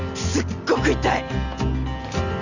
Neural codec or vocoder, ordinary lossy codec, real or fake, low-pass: none; none; real; 7.2 kHz